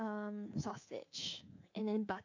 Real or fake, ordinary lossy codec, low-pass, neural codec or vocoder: fake; AAC, 48 kbps; 7.2 kHz; codec, 24 kHz, 3.1 kbps, DualCodec